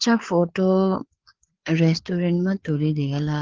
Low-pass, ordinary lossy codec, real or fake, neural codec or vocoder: 7.2 kHz; Opus, 16 kbps; fake; codec, 16 kHz, 4 kbps, FreqCodec, larger model